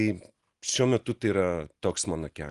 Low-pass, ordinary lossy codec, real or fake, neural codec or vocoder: 10.8 kHz; Opus, 24 kbps; real; none